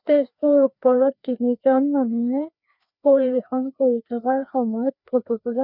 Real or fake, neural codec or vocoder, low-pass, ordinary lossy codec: fake; codec, 16 kHz, 2 kbps, FreqCodec, larger model; 5.4 kHz; none